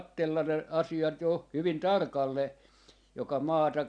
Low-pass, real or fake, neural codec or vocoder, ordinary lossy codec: 9.9 kHz; real; none; none